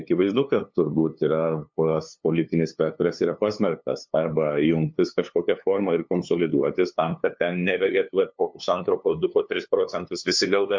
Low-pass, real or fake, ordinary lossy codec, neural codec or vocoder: 7.2 kHz; fake; MP3, 64 kbps; codec, 16 kHz, 2 kbps, FunCodec, trained on LibriTTS, 25 frames a second